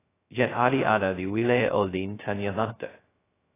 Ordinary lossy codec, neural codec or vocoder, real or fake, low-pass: AAC, 16 kbps; codec, 16 kHz, 0.2 kbps, FocalCodec; fake; 3.6 kHz